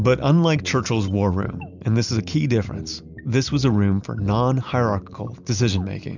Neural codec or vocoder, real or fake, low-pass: none; real; 7.2 kHz